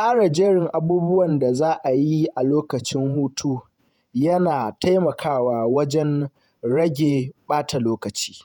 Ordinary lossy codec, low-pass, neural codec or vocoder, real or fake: none; 19.8 kHz; vocoder, 48 kHz, 128 mel bands, Vocos; fake